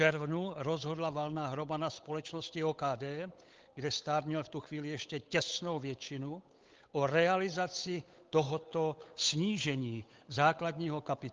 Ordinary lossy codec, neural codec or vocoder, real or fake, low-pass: Opus, 24 kbps; codec, 16 kHz, 8 kbps, FunCodec, trained on Chinese and English, 25 frames a second; fake; 7.2 kHz